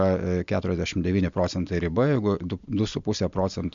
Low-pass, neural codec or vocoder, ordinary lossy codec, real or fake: 7.2 kHz; none; MP3, 64 kbps; real